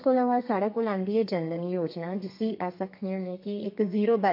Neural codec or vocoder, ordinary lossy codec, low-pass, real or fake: codec, 32 kHz, 1.9 kbps, SNAC; MP3, 32 kbps; 5.4 kHz; fake